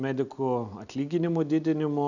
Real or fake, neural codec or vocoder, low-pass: real; none; 7.2 kHz